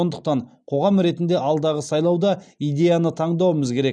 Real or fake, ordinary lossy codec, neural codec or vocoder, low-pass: real; none; none; 9.9 kHz